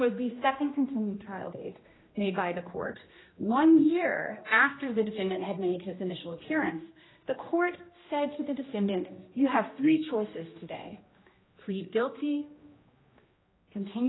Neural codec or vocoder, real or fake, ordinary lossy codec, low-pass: codec, 16 kHz, 1 kbps, X-Codec, HuBERT features, trained on general audio; fake; AAC, 16 kbps; 7.2 kHz